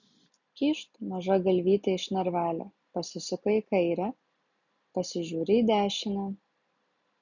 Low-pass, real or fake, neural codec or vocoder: 7.2 kHz; real; none